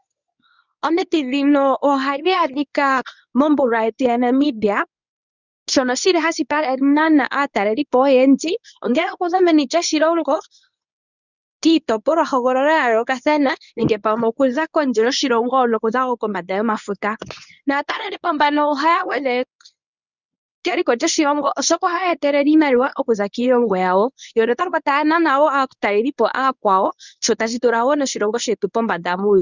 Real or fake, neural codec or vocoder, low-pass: fake; codec, 24 kHz, 0.9 kbps, WavTokenizer, medium speech release version 1; 7.2 kHz